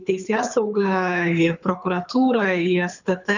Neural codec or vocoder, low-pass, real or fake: codec, 24 kHz, 6 kbps, HILCodec; 7.2 kHz; fake